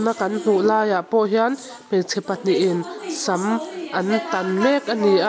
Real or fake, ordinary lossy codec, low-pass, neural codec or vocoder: real; none; none; none